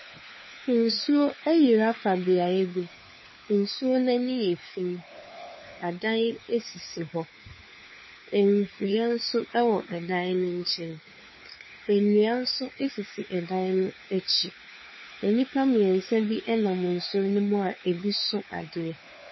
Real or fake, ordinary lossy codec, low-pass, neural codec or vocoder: fake; MP3, 24 kbps; 7.2 kHz; codec, 16 kHz, 4 kbps, FunCodec, trained on LibriTTS, 50 frames a second